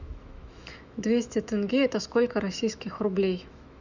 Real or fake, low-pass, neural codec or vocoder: fake; 7.2 kHz; autoencoder, 48 kHz, 128 numbers a frame, DAC-VAE, trained on Japanese speech